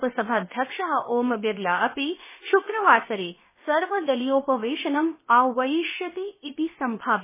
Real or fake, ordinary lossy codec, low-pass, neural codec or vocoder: fake; MP3, 16 kbps; 3.6 kHz; codec, 16 kHz, about 1 kbps, DyCAST, with the encoder's durations